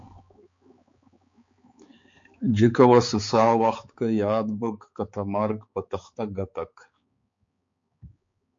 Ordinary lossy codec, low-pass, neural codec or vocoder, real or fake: MP3, 48 kbps; 7.2 kHz; codec, 16 kHz, 4 kbps, X-Codec, HuBERT features, trained on balanced general audio; fake